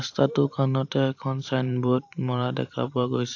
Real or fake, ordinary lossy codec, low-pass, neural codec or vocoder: fake; AAC, 48 kbps; 7.2 kHz; vocoder, 44.1 kHz, 128 mel bands every 512 samples, BigVGAN v2